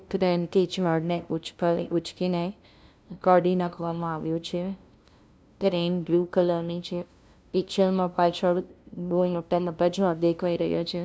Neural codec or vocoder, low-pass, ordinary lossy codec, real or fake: codec, 16 kHz, 0.5 kbps, FunCodec, trained on LibriTTS, 25 frames a second; none; none; fake